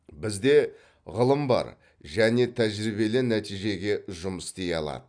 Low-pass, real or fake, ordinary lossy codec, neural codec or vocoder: 9.9 kHz; real; none; none